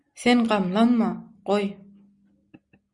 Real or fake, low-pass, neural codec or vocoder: real; 10.8 kHz; none